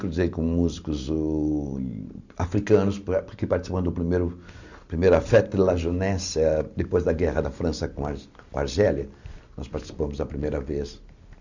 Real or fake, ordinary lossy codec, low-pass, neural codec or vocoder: real; none; 7.2 kHz; none